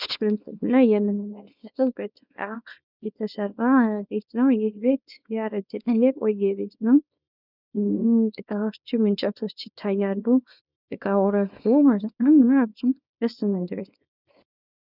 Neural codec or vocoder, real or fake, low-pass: codec, 24 kHz, 0.9 kbps, WavTokenizer, small release; fake; 5.4 kHz